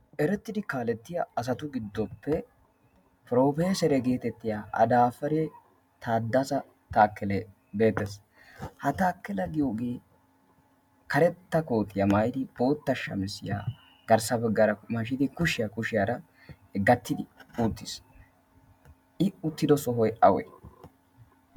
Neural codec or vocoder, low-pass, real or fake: none; 19.8 kHz; real